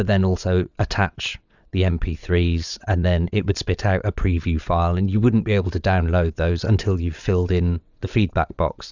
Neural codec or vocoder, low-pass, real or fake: vocoder, 22.05 kHz, 80 mel bands, WaveNeXt; 7.2 kHz; fake